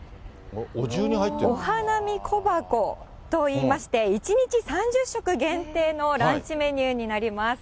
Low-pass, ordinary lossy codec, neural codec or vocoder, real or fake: none; none; none; real